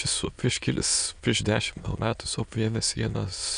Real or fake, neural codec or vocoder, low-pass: fake; autoencoder, 22.05 kHz, a latent of 192 numbers a frame, VITS, trained on many speakers; 9.9 kHz